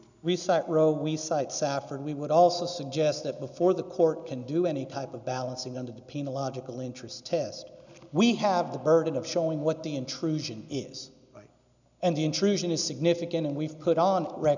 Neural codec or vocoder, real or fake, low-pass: none; real; 7.2 kHz